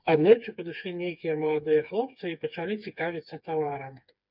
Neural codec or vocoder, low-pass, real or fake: codec, 16 kHz, 4 kbps, FreqCodec, smaller model; 5.4 kHz; fake